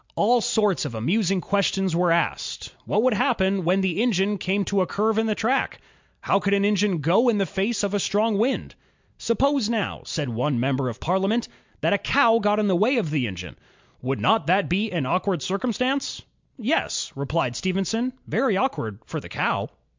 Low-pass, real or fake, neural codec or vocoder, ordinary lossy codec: 7.2 kHz; real; none; MP3, 64 kbps